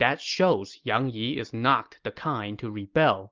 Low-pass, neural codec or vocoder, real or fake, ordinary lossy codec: 7.2 kHz; none; real; Opus, 32 kbps